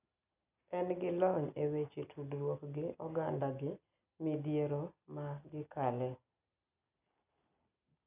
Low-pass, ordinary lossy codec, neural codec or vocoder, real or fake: 3.6 kHz; none; none; real